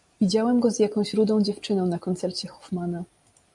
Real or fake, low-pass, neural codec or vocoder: real; 10.8 kHz; none